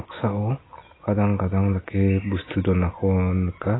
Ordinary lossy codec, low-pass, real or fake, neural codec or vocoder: AAC, 16 kbps; 7.2 kHz; real; none